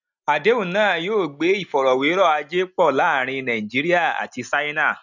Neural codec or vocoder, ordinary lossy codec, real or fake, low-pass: none; none; real; 7.2 kHz